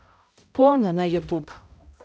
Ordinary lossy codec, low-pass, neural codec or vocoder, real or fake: none; none; codec, 16 kHz, 0.5 kbps, X-Codec, HuBERT features, trained on balanced general audio; fake